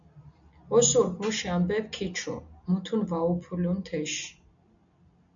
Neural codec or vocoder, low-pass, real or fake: none; 7.2 kHz; real